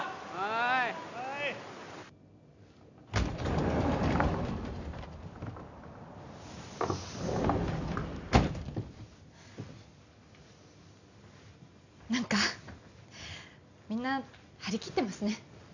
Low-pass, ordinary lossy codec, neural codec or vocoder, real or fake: 7.2 kHz; none; none; real